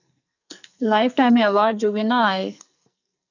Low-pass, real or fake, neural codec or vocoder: 7.2 kHz; fake; codec, 44.1 kHz, 2.6 kbps, SNAC